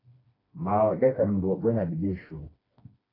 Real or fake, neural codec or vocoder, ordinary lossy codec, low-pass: fake; codec, 16 kHz, 2 kbps, FreqCodec, smaller model; AAC, 24 kbps; 5.4 kHz